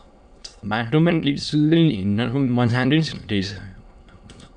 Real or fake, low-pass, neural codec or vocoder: fake; 9.9 kHz; autoencoder, 22.05 kHz, a latent of 192 numbers a frame, VITS, trained on many speakers